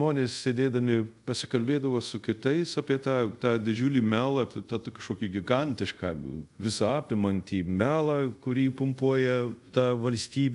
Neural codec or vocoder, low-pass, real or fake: codec, 24 kHz, 0.5 kbps, DualCodec; 10.8 kHz; fake